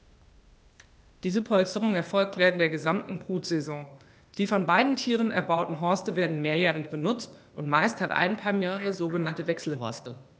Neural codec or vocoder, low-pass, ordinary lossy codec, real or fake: codec, 16 kHz, 0.8 kbps, ZipCodec; none; none; fake